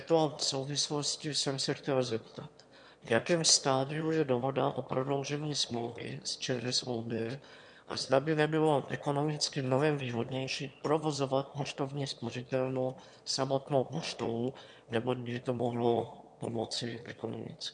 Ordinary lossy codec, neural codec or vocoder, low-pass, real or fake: MP3, 64 kbps; autoencoder, 22.05 kHz, a latent of 192 numbers a frame, VITS, trained on one speaker; 9.9 kHz; fake